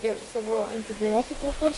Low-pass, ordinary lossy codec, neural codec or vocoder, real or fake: 14.4 kHz; MP3, 48 kbps; codec, 32 kHz, 1.9 kbps, SNAC; fake